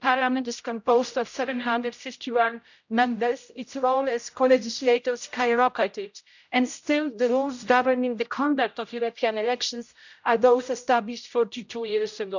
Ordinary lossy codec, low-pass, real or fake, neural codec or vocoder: none; 7.2 kHz; fake; codec, 16 kHz, 0.5 kbps, X-Codec, HuBERT features, trained on general audio